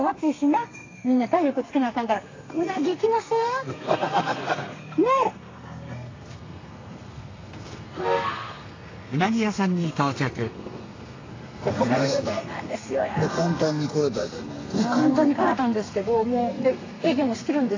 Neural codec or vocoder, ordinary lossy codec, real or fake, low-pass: codec, 32 kHz, 1.9 kbps, SNAC; AAC, 32 kbps; fake; 7.2 kHz